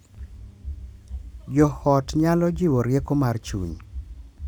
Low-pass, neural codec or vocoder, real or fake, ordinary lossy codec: 19.8 kHz; vocoder, 44.1 kHz, 128 mel bands every 512 samples, BigVGAN v2; fake; none